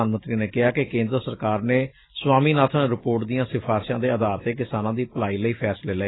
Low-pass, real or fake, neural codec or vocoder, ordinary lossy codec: 7.2 kHz; real; none; AAC, 16 kbps